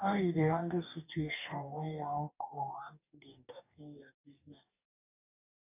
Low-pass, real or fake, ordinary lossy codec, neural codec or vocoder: 3.6 kHz; fake; none; codec, 44.1 kHz, 2.6 kbps, DAC